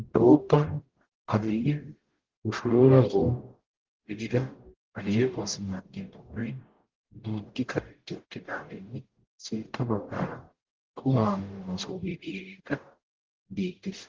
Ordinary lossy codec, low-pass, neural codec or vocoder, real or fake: Opus, 16 kbps; 7.2 kHz; codec, 44.1 kHz, 0.9 kbps, DAC; fake